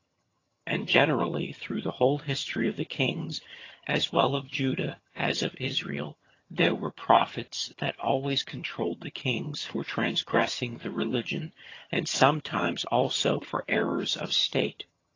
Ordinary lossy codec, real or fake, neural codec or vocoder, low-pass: AAC, 32 kbps; fake; vocoder, 22.05 kHz, 80 mel bands, HiFi-GAN; 7.2 kHz